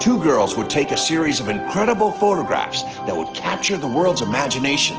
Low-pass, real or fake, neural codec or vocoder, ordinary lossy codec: 7.2 kHz; real; none; Opus, 16 kbps